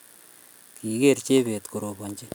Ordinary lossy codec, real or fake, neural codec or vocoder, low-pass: none; real; none; none